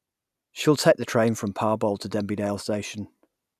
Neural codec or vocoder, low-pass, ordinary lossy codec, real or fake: none; 14.4 kHz; none; real